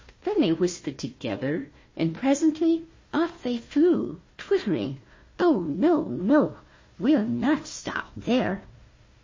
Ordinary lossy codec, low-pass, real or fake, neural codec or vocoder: MP3, 32 kbps; 7.2 kHz; fake; codec, 16 kHz, 1 kbps, FunCodec, trained on Chinese and English, 50 frames a second